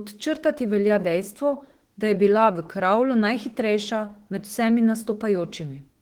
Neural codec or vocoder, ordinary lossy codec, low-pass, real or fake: autoencoder, 48 kHz, 32 numbers a frame, DAC-VAE, trained on Japanese speech; Opus, 16 kbps; 19.8 kHz; fake